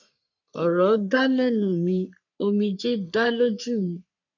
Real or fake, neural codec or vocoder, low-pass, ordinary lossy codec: fake; codec, 44.1 kHz, 3.4 kbps, Pupu-Codec; 7.2 kHz; none